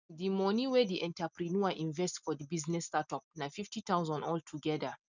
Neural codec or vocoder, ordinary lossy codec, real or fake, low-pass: none; none; real; 7.2 kHz